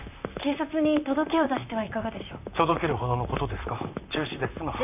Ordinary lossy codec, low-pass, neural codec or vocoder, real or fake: none; 3.6 kHz; vocoder, 44.1 kHz, 128 mel bands, Pupu-Vocoder; fake